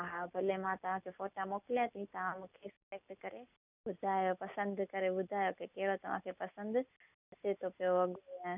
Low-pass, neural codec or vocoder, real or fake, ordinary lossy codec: 3.6 kHz; none; real; none